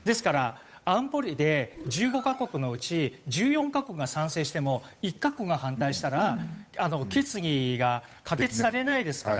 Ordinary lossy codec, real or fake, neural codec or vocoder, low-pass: none; fake; codec, 16 kHz, 8 kbps, FunCodec, trained on Chinese and English, 25 frames a second; none